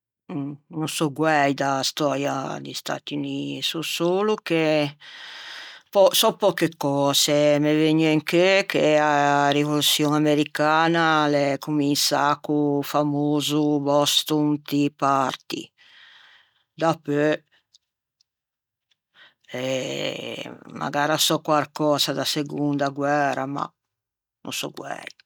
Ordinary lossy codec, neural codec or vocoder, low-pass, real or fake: none; none; 19.8 kHz; real